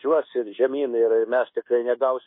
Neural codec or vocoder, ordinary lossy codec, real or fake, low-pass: codec, 24 kHz, 1.2 kbps, DualCodec; MP3, 24 kbps; fake; 5.4 kHz